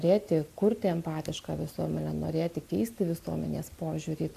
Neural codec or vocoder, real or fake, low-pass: none; real; 14.4 kHz